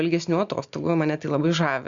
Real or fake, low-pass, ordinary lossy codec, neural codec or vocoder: real; 7.2 kHz; Opus, 64 kbps; none